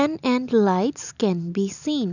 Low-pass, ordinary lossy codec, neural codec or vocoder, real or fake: 7.2 kHz; none; none; real